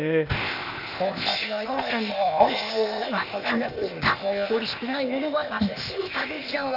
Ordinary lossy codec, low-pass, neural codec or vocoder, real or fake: none; 5.4 kHz; codec, 16 kHz, 0.8 kbps, ZipCodec; fake